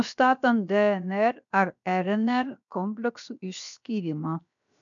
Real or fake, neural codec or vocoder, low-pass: fake; codec, 16 kHz, 0.7 kbps, FocalCodec; 7.2 kHz